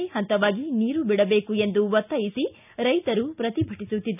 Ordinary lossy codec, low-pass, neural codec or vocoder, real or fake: none; 3.6 kHz; none; real